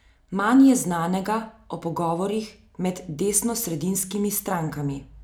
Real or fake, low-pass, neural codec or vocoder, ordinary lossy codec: real; none; none; none